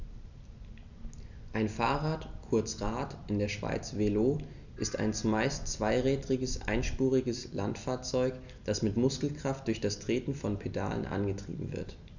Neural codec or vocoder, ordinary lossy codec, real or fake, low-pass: none; none; real; 7.2 kHz